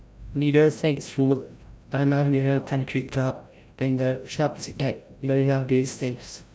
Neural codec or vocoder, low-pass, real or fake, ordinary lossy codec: codec, 16 kHz, 0.5 kbps, FreqCodec, larger model; none; fake; none